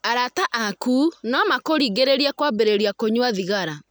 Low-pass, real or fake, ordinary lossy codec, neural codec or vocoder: none; real; none; none